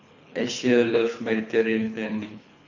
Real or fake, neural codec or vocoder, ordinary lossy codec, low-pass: fake; codec, 24 kHz, 3 kbps, HILCodec; none; 7.2 kHz